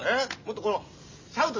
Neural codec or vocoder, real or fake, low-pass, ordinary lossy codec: none; real; 7.2 kHz; MP3, 32 kbps